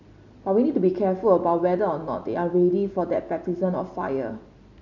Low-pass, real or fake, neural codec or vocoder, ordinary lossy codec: 7.2 kHz; real; none; none